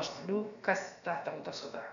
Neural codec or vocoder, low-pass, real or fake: codec, 16 kHz, about 1 kbps, DyCAST, with the encoder's durations; 7.2 kHz; fake